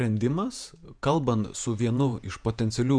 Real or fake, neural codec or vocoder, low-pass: fake; vocoder, 48 kHz, 128 mel bands, Vocos; 9.9 kHz